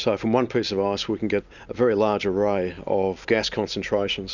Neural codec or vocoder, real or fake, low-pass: none; real; 7.2 kHz